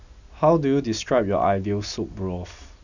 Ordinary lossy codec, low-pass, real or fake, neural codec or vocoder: none; 7.2 kHz; real; none